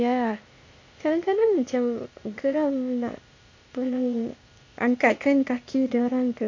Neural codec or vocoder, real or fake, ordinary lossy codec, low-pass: codec, 16 kHz, 0.8 kbps, ZipCodec; fake; MP3, 48 kbps; 7.2 kHz